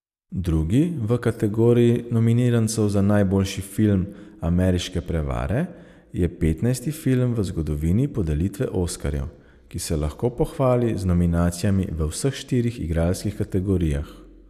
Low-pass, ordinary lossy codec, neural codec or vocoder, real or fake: 14.4 kHz; none; none; real